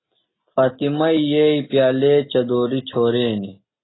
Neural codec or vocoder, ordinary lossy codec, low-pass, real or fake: none; AAC, 16 kbps; 7.2 kHz; real